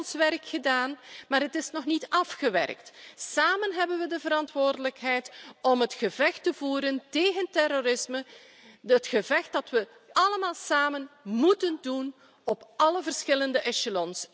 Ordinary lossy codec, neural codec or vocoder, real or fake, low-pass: none; none; real; none